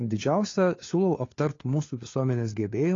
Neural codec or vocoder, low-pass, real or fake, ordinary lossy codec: codec, 16 kHz, 4 kbps, FunCodec, trained on LibriTTS, 50 frames a second; 7.2 kHz; fake; AAC, 32 kbps